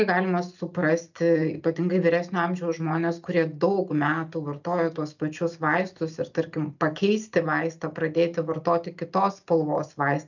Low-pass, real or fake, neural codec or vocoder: 7.2 kHz; real; none